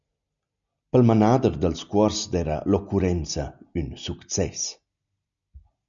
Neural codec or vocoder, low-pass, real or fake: none; 7.2 kHz; real